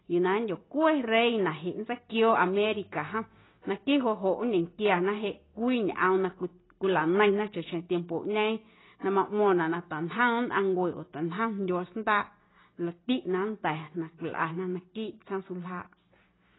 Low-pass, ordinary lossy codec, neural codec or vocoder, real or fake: 7.2 kHz; AAC, 16 kbps; none; real